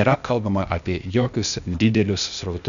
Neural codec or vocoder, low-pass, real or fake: codec, 16 kHz, 0.8 kbps, ZipCodec; 7.2 kHz; fake